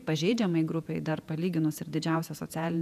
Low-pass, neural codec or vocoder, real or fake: 14.4 kHz; vocoder, 48 kHz, 128 mel bands, Vocos; fake